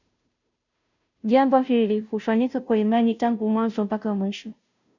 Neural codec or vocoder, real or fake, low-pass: codec, 16 kHz, 0.5 kbps, FunCodec, trained on Chinese and English, 25 frames a second; fake; 7.2 kHz